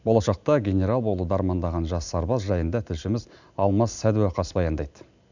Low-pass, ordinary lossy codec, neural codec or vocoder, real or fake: 7.2 kHz; none; none; real